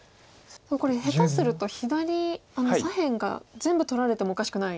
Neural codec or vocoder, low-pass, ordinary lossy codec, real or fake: none; none; none; real